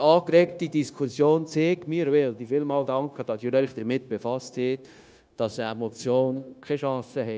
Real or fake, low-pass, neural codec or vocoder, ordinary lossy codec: fake; none; codec, 16 kHz, 0.9 kbps, LongCat-Audio-Codec; none